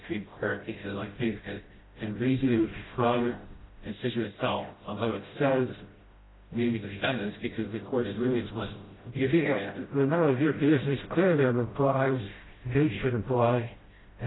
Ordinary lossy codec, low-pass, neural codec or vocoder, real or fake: AAC, 16 kbps; 7.2 kHz; codec, 16 kHz, 0.5 kbps, FreqCodec, smaller model; fake